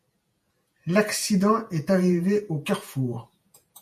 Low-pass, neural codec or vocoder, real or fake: 14.4 kHz; vocoder, 48 kHz, 128 mel bands, Vocos; fake